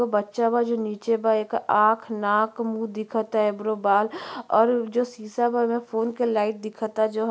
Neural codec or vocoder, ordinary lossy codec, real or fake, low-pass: none; none; real; none